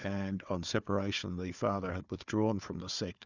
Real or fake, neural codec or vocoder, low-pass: fake; codec, 16 kHz, 4 kbps, FunCodec, trained on Chinese and English, 50 frames a second; 7.2 kHz